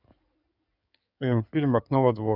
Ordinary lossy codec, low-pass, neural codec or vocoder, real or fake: none; 5.4 kHz; codec, 16 kHz in and 24 kHz out, 2.2 kbps, FireRedTTS-2 codec; fake